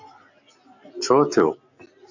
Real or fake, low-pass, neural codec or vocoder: real; 7.2 kHz; none